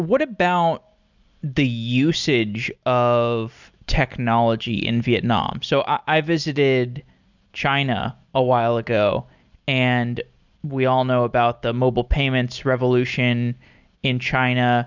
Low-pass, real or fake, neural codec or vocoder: 7.2 kHz; real; none